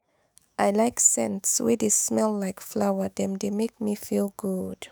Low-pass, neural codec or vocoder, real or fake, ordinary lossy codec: none; autoencoder, 48 kHz, 128 numbers a frame, DAC-VAE, trained on Japanese speech; fake; none